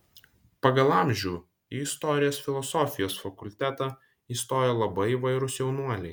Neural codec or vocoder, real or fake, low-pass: none; real; 19.8 kHz